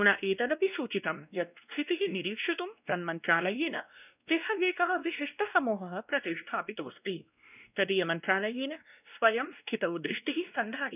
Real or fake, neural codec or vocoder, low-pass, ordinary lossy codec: fake; codec, 16 kHz, 1 kbps, X-Codec, WavLM features, trained on Multilingual LibriSpeech; 3.6 kHz; none